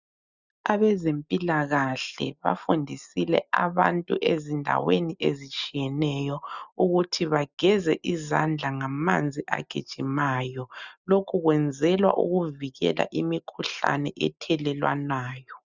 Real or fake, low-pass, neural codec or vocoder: real; 7.2 kHz; none